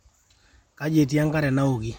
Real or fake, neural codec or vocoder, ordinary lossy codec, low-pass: real; none; MP3, 64 kbps; 19.8 kHz